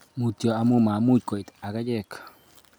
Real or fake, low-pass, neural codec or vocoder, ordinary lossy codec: real; none; none; none